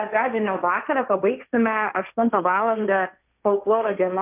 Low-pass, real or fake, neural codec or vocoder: 3.6 kHz; fake; codec, 16 kHz, 1.1 kbps, Voila-Tokenizer